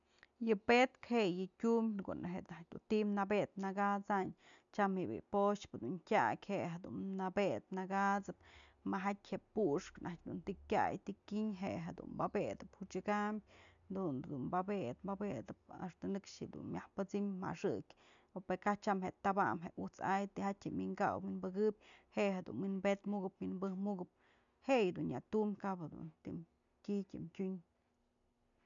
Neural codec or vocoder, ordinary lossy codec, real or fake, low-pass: none; none; real; 7.2 kHz